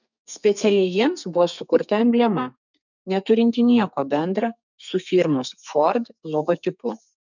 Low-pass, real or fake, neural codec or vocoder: 7.2 kHz; fake; codec, 32 kHz, 1.9 kbps, SNAC